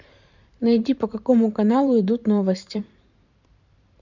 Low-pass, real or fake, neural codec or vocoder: 7.2 kHz; fake; vocoder, 44.1 kHz, 128 mel bands every 512 samples, BigVGAN v2